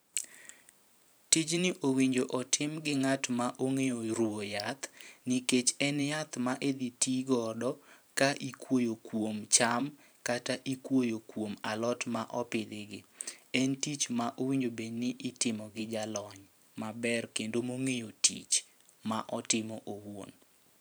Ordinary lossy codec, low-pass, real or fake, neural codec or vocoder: none; none; fake; vocoder, 44.1 kHz, 128 mel bands every 512 samples, BigVGAN v2